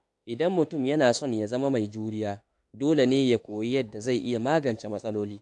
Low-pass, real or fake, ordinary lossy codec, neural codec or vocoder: 10.8 kHz; fake; AAC, 64 kbps; autoencoder, 48 kHz, 32 numbers a frame, DAC-VAE, trained on Japanese speech